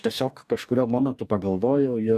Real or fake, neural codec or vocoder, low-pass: fake; codec, 44.1 kHz, 2.6 kbps, DAC; 14.4 kHz